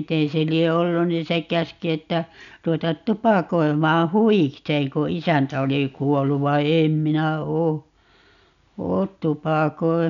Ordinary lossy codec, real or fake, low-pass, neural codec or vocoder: none; real; 7.2 kHz; none